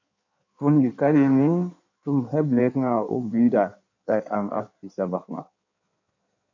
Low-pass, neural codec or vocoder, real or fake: 7.2 kHz; codec, 16 kHz in and 24 kHz out, 1.1 kbps, FireRedTTS-2 codec; fake